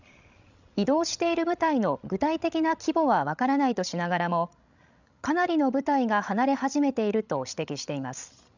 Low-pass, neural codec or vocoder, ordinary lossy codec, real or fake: 7.2 kHz; codec, 16 kHz, 16 kbps, FreqCodec, larger model; none; fake